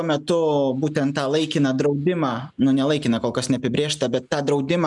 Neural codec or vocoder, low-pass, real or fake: none; 10.8 kHz; real